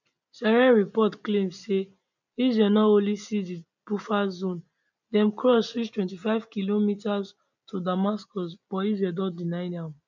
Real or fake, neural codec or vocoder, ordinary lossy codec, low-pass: real; none; none; 7.2 kHz